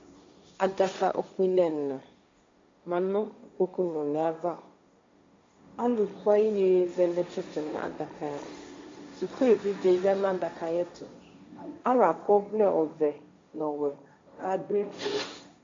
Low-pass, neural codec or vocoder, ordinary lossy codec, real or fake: 7.2 kHz; codec, 16 kHz, 1.1 kbps, Voila-Tokenizer; MP3, 48 kbps; fake